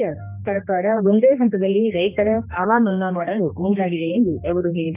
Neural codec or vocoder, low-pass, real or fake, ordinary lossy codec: codec, 16 kHz, 1 kbps, X-Codec, HuBERT features, trained on general audio; 3.6 kHz; fake; none